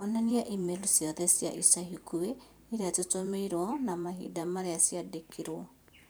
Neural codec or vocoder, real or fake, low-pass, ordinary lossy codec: vocoder, 44.1 kHz, 128 mel bands every 512 samples, BigVGAN v2; fake; none; none